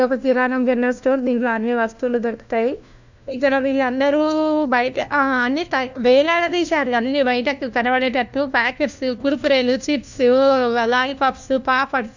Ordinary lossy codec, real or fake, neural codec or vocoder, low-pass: none; fake; codec, 16 kHz, 1 kbps, FunCodec, trained on LibriTTS, 50 frames a second; 7.2 kHz